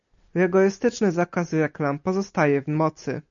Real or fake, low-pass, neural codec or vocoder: real; 7.2 kHz; none